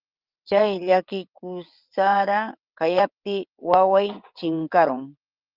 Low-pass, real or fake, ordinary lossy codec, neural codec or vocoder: 5.4 kHz; fake; Opus, 24 kbps; vocoder, 22.05 kHz, 80 mel bands, WaveNeXt